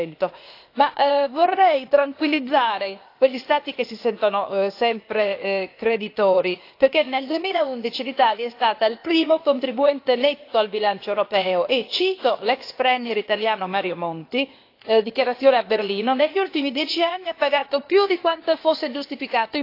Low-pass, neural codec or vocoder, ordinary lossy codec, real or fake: 5.4 kHz; codec, 16 kHz, 0.8 kbps, ZipCodec; AAC, 32 kbps; fake